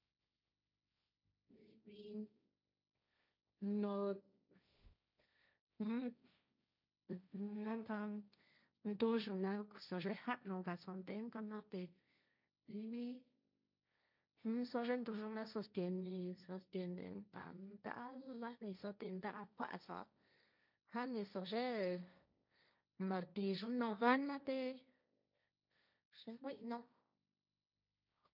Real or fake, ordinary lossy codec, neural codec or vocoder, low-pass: fake; none; codec, 16 kHz, 1.1 kbps, Voila-Tokenizer; 5.4 kHz